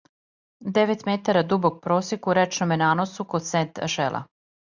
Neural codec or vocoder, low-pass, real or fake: none; 7.2 kHz; real